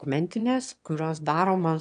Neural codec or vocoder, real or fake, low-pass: autoencoder, 22.05 kHz, a latent of 192 numbers a frame, VITS, trained on one speaker; fake; 9.9 kHz